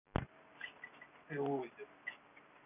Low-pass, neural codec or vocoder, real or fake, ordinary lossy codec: 3.6 kHz; none; real; none